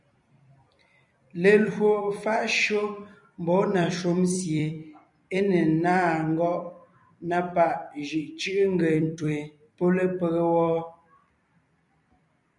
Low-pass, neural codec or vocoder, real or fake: 10.8 kHz; vocoder, 44.1 kHz, 128 mel bands every 256 samples, BigVGAN v2; fake